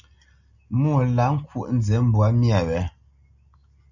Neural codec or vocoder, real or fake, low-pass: none; real; 7.2 kHz